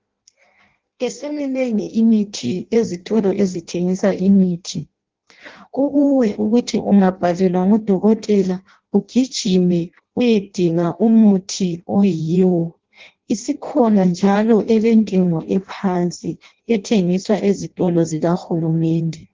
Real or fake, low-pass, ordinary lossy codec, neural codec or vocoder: fake; 7.2 kHz; Opus, 16 kbps; codec, 16 kHz in and 24 kHz out, 0.6 kbps, FireRedTTS-2 codec